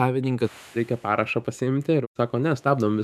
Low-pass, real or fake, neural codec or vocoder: 14.4 kHz; fake; autoencoder, 48 kHz, 128 numbers a frame, DAC-VAE, trained on Japanese speech